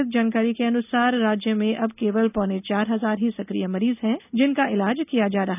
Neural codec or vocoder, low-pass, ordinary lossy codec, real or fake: none; 3.6 kHz; none; real